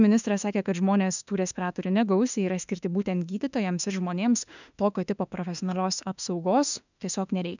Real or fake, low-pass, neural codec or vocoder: fake; 7.2 kHz; autoencoder, 48 kHz, 32 numbers a frame, DAC-VAE, trained on Japanese speech